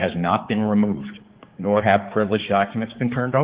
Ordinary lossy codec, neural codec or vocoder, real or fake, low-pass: Opus, 16 kbps; codec, 16 kHz, 4 kbps, X-Codec, HuBERT features, trained on balanced general audio; fake; 3.6 kHz